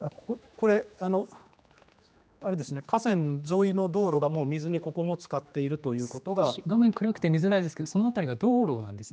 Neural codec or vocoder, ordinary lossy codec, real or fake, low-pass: codec, 16 kHz, 2 kbps, X-Codec, HuBERT features, trained on general audio; none; fake; none